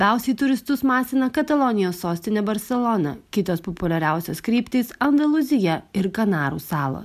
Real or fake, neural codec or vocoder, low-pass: real; none; 14.4 kHz